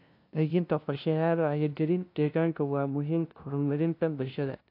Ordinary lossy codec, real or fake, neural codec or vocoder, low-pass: AAC, 32 kbps; fake; codec, 16 kHz, 0.5 kbps, FunCodec, trained on LibriTTS, 25 frames a second; 5.4 kHz